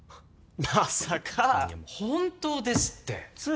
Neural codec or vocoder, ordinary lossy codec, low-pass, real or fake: none; none; none; real